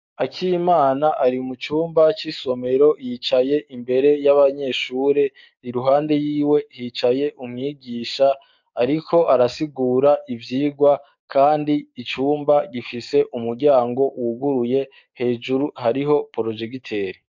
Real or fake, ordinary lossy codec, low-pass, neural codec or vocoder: fake; MP3, 64 kbps; 7.2 kHz; codec, 44.1 kHz, 7.8 kbps, DAC